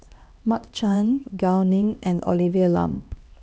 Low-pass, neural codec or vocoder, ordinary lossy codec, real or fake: none; codec, 16 kHz, 1 kbps, X-Codec, HuBERT features, trained on LibriSpeech; none; fake